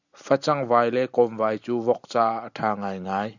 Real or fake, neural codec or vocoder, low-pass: real; none; 7.2 kHz